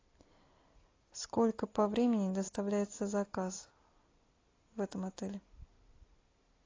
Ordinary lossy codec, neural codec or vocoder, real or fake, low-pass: AAC, 32 kbps; none; real; 7.2 kHz